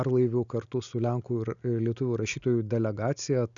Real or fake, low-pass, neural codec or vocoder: real; 7.2 kHz; none